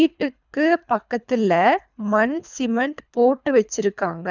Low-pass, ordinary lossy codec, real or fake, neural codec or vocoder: 7.2 kHz; none; fake; codec, 24 kHz, 3 kbps, HILCodec